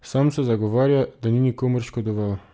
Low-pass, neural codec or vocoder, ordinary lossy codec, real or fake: none; none; none; real